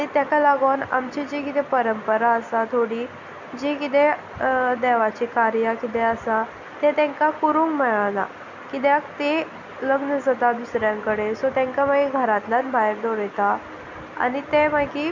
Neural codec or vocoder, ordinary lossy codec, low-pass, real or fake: none; none; 7.2 kHz; real